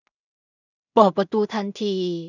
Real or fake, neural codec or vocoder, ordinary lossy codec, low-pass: fake; codec, 16 kHz in and 24 kHz out, 0.4 kbps, LongCat-Audio-Codec, two codebook decoder; none; 7.2 kHz